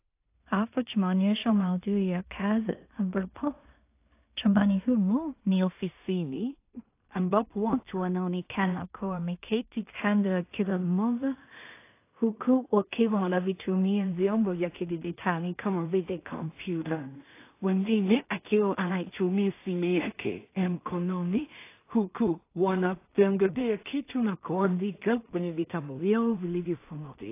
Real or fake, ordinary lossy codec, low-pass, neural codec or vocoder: fake; AAC, 24 kbps; 3.6 kHz; codec, 16 kHz in and 24 kHz out, 0.4 kbps, LongCat-Audio-Codec, two codebook decoder